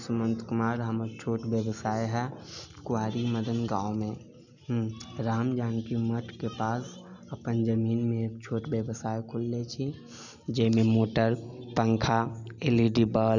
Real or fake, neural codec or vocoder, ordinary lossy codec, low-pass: real; none; none; 7.2 kHz